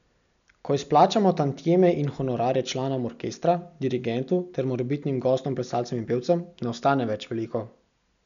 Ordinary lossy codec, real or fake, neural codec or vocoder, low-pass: none; real; none; 7.2 kHz